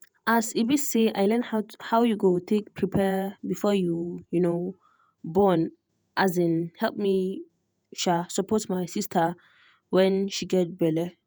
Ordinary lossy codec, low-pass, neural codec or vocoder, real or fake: none; none; vocoder, 48 kHz, 128 mel bands, Vocos; fake